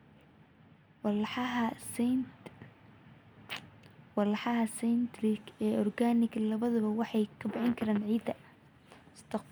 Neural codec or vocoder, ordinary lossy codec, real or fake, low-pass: none; none; real; none